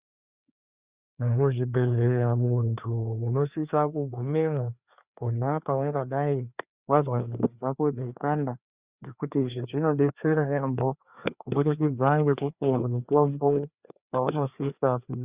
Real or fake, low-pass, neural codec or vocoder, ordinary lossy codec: fake; 3.6 kHz; codec, 16 kHz, 2 kbps, FreqCodec, larger model; Opus, 64 kbps